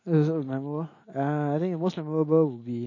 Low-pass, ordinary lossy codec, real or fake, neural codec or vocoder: 7.2 kHz; MP3, 32 kbps; real; none